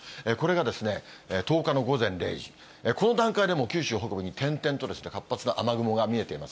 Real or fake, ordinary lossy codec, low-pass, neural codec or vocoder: real; none; none; none